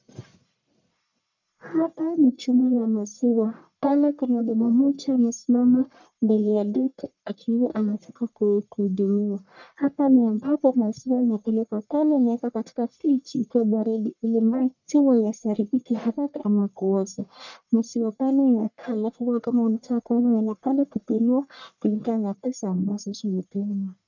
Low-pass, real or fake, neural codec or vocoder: 7.2 kHz; fake; codec, 44.1 kHz, 1.7 kbps, Pupu-Codec